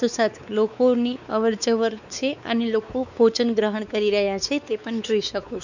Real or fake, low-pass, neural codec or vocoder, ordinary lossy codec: fake; 7.2 kHz; codec, 16 kHz, 4 kbps, X-Codec, WavLM features, trained on Multilingual LibriSpeech; none